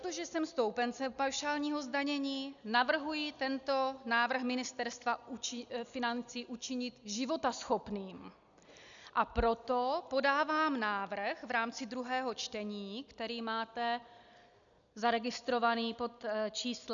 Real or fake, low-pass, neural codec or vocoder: real; 7.2 kHz; none